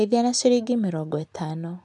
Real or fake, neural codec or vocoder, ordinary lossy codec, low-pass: real; none; none; 10.8 kHz